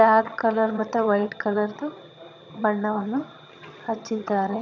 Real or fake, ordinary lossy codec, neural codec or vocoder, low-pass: fake; none; vocoder, 22.05 kHz, 80 mel bands, HiFi-GAN; 7.2 kHz